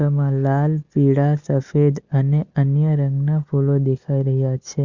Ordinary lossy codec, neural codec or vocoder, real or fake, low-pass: Opus, 64 kbps; none; real; 7.2 kHz